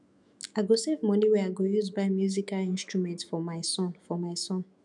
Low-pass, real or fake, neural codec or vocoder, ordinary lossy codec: 10.8 kHz; fake; autoencoder, 48 kHz, 128 numbers a frame, DAC-VAE, trained on Japanese speech; none